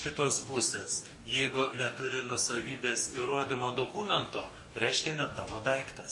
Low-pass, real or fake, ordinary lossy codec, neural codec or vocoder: 10.8 kHz; fake; MP3, 48 kbps; codec, 44.1 kHz, 2.6 kbps, DAC